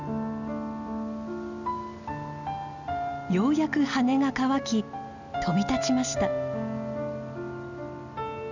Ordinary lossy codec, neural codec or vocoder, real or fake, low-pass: none; none; real; 7.2 kHz